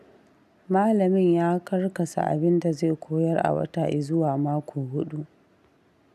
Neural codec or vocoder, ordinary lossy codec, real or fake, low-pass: none; none; real; 14.4 kHz